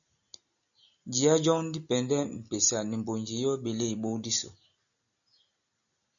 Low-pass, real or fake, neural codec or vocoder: 7.2 kHz; real; none